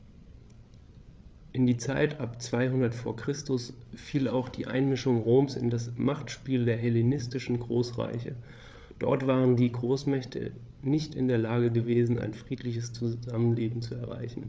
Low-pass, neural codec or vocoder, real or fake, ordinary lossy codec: none; codec, 16 kHz, 8 kbps, FreqCodec, larger model; fake; none